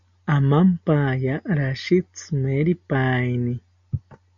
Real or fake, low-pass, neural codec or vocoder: real; 7.2 kHz; none